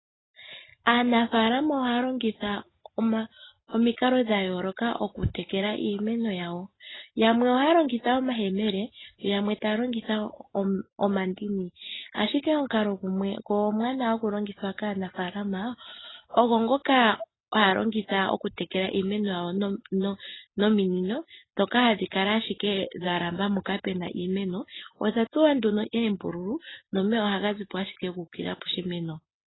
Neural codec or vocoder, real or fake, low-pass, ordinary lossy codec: none; real; 7.2 kHz; AAC, 16 kbps